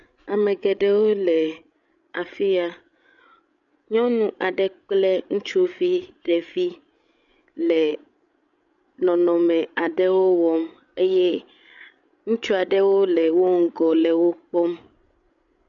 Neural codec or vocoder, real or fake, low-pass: codec, 16 kHz, 16 kbps, FreqCodec, larger model; fake; 7.2 kHz